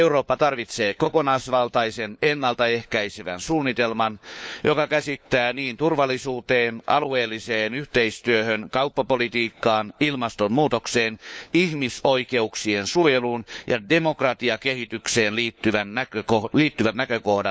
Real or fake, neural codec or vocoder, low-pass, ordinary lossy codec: fake; codec, 16 kHz, 4 kbps, FunCodec, trained on LibriTTS, 50 frames a second; none; none